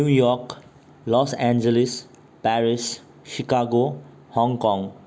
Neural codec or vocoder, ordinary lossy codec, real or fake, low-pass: none; none; real; none